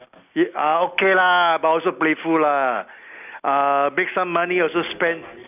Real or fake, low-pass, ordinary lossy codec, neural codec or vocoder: real; 3.6 kHz; none; none